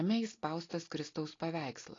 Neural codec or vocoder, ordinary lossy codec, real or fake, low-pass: none; AAC, 32 kbps; real; 7.2 kHz